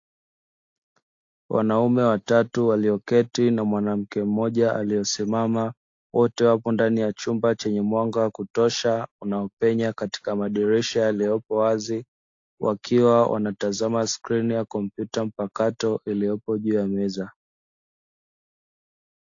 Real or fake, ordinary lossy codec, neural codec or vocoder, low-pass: real; AAC, 48 kbps; none; 7.2 kHz